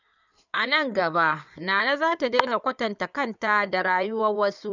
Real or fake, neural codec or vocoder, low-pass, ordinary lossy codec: fake; codec, 16 kHz in and 24 kHz out, 2.2 kbps, FireRedTTS-2 codec; 7.2 kHz; none